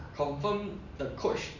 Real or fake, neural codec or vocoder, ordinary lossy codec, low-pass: real; none; none; 7.2 kHz